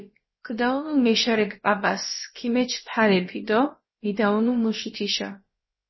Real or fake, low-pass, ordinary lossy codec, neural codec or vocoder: fake; 7.2 kHz; MP3, 24 kbps; codec, 16 kHz, about 1 kbps, DyCAST, with the encoder's durations